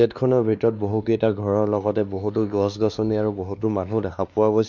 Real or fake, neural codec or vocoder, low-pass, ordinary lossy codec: fake; codec, 16 kHz, 2 kbps, X-Codec, WavLM features, trained on Multilingual LibriSpeech; 7.2 kHz; none